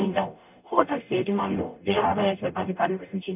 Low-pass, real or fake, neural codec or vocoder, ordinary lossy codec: 3.6 kHz; fake; codec, 44.1 kHz, 0.9 kbps, DAC; none